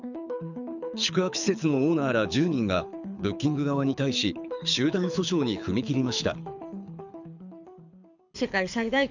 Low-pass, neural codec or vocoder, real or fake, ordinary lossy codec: 7.2 kHz; codec, 24 kHz, 6 kbps, HILCodec; fake; none